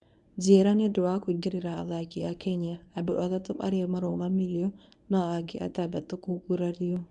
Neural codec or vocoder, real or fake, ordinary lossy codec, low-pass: codec, 24 kHz, 0.9 kbps, WavTokenizer, medium speech release version 1; fake; none; 10.8 kHz